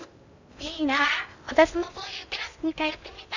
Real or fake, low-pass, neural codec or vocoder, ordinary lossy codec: fake; 7.2 kHz; codec, 16 kHz in and 24 kHz out, 0.6 kbps, FocalCodec, streaming, 2048 codes; none